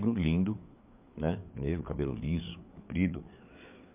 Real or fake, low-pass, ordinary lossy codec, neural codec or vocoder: fake; 3.6 kHz; MP3, 32 kbps; codec, 16 kHz, 4 kbps, FreqCodec, larger model